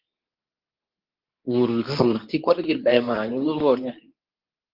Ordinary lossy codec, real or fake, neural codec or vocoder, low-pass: Opus, 32 kbps; fake; codec, 24 kHz, 0.9 kbps, WavTokenizer, medium speech release version 2; 5.4 kHz